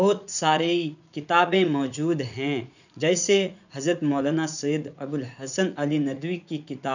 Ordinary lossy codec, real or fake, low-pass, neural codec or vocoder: none; fake; 7.2 kHz; vocoder, 44.1 kHz, 80 mel bands, Vocos